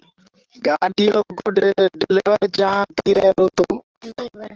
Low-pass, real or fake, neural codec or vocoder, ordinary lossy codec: 7.2 kHz; fake; codec, 16 kHz in and 24 kHz out, 2.2 kbps, FireRedTTS-2 codec; Opus, 16 kbps